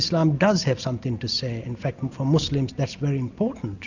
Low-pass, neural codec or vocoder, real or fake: 7.2 kHz; none; real